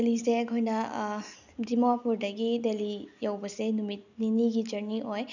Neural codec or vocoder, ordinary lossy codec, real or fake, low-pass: none; none; real; 7.2 kHz